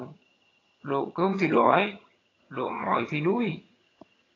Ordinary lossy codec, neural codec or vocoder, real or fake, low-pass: AAC, 32 kbps; vocoder, 22.05 kHz, 80 mel bands, HiFi-GAN; fake; 7.2 kHz